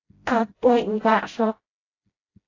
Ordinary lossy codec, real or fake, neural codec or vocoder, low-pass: AAC, 32 kbps; fake; codec, 16 kHz, 0.5 kbps, FreqCodec, smaller model; 7.2 kHz